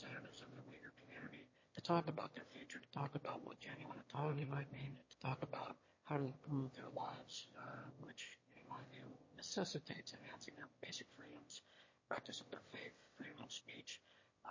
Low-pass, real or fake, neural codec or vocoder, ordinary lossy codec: 7.2 kHz; fake; autoencoder, 22.05 kHz, a latent of 192 numbers a frame, VITS, trained on one speaker; MP3, 32 kbps